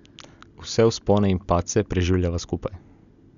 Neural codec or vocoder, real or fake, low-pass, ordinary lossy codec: none; real; 7.2 kHz; none